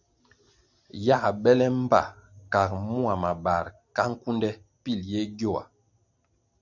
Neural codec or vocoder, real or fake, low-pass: none; real; 7.2 kHz